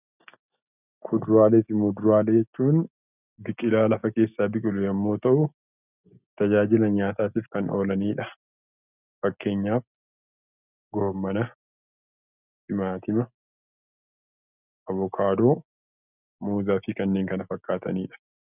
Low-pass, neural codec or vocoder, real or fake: 3.6 kHz; none; real